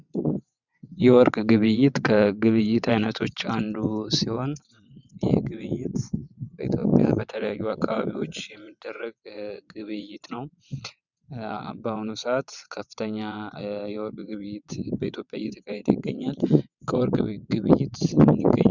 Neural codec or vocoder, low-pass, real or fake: vocoder, 22.05 kHz, 80 mel bands, WaveNeXt; 7.2 kHz; fake